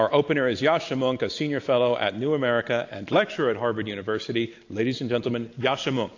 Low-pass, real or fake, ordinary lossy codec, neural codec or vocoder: 7.2 kHz; real; AAC, 48 kbps; none